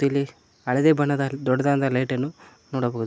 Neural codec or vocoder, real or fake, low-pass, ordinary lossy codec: none; real; none; none